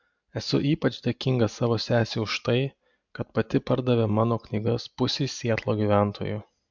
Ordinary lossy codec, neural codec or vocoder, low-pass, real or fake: MP3, 64 kbps; none; 7.2 kHz; real